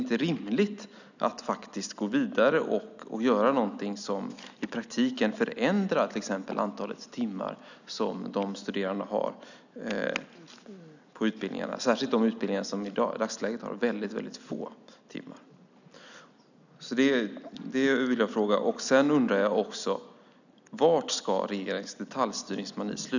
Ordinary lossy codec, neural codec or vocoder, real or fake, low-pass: none; none; real; 7.2 kHz